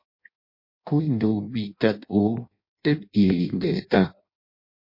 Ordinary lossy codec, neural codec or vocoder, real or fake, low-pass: MP3, 32 kbps; codec, 16 kHz in and 24 kHz out, 0.6 kbps, FireRedTTS-2 codec; fake; 5.4 kHz